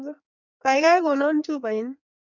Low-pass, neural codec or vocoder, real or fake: 7.2 kHz; codec, 16 kHz in and 24 kHz out, 1.1 kbps, FireRedTTS-2 codec; fake